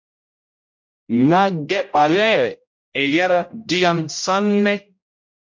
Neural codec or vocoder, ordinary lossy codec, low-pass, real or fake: codec, 16 kHz, 0.5 kbps, X-Codec, HuBERT features, trained on general audio; MP3, 48 kbps; 7.2 kHz; fake